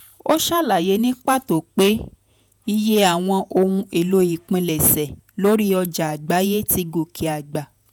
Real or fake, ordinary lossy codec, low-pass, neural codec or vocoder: fake; none; none; vocoder, 48 kHz, 128 mel bands, Vocos